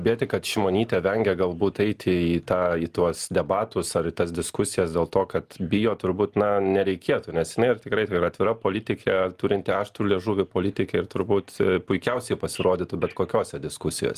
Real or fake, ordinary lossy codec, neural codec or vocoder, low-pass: real; Opus, 32 kbps; none; 14.4 kHz